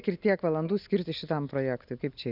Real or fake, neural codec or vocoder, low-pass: real; none; 5.4 kHz